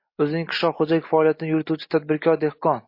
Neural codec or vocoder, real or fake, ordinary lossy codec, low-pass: none; real; MP3, 32 kbps; 5.4 kHz